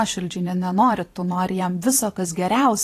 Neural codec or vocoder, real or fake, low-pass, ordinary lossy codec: vocoder, 44.1 kHz, 128 mel bands every 512 samples, BigVGAN v2; fake; 14.4 kHz; AAC, 48 kbps